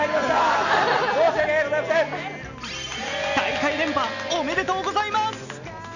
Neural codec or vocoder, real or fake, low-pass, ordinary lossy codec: none; real; 7.2 kHz; none